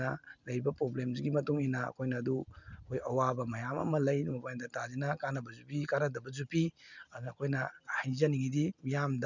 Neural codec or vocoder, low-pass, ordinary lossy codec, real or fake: none; 7.2 kHz; none; real